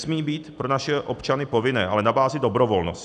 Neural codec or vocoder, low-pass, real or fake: none; 10.8 kHz; real